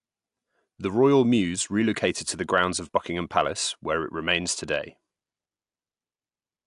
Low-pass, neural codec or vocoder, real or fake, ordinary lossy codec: 10.8 kHz; none; real; AAC, 64 kbps